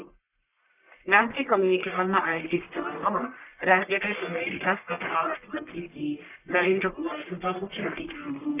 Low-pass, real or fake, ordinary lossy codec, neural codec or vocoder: 3.6 kHz; fake; none; codec, 44.1 kHz, 1.7 kbps, Pupu-Codec